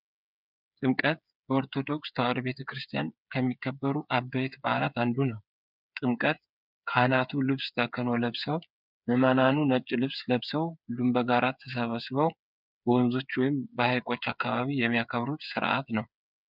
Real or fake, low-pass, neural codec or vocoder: fake; 5.4 kHz; codec, 16 kHz, 8 kbps, FreqCodec, smaller model